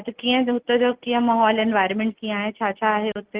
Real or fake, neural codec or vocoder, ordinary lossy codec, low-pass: real; none; Opus, 16 kbps; 3.6 kHz